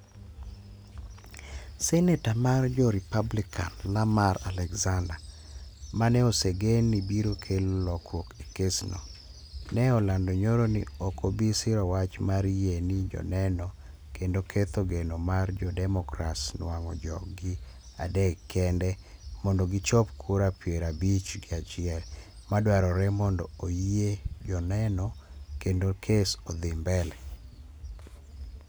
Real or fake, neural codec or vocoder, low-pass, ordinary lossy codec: real; none; none; none